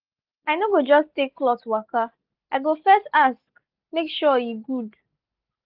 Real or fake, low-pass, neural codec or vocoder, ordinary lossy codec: real; 5.4 kHz; none; Opus, 24 kbps